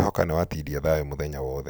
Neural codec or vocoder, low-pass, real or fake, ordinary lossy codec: none; none; real; none